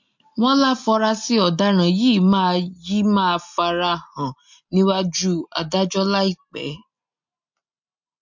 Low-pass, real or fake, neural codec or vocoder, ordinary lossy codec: 7.2 kHz; real; none; MP3, 48 kbps